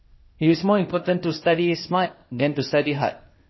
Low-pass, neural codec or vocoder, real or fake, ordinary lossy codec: 7.2 kHz; codec, 16 kHz, 0.8 kbps, ZipCodec; fake; MP3, 24 kbps